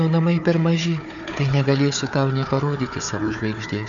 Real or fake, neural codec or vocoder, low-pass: fake; codec, 16 kHz, 4 kbps, FunCodec, trained on Chinese and English, 50 frames a second; 7.2 kHz